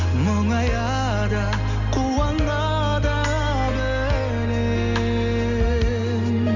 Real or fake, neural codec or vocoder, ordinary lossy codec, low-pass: real; none; none; 7.2 kHz